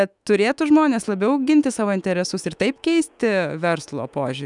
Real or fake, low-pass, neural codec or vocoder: fake; 10.8 kHz; autoencoder, 48 kHz, 128 numbers a frame, DAC-VAE, trained on Japanese speech